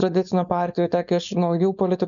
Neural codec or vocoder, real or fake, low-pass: codec, 16 kHz, 4.8 kbps, FACodec; fake; 7.2 kHz